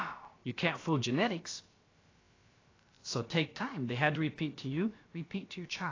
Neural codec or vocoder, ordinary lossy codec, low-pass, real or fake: codec, 16 kHz, about 1 kbps, DyCAST, with the encoder's durations; AAC, 32 kbps; 7.2 kHz; fake